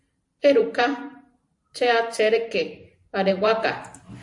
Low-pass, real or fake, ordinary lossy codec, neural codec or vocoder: 10.8 kHz; fake; AAC, 64 kbps; vocoder, 44.1 kHz, 128 mel bands every 512 samples, BigVGAN v2